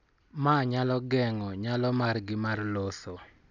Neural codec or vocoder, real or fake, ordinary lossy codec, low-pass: none; real; none; 7.2 kHz